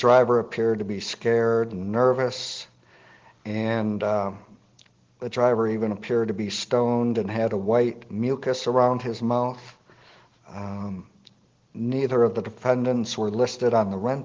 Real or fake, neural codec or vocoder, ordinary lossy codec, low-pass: real; none; Opus, 16 kbps; 7.2 kHz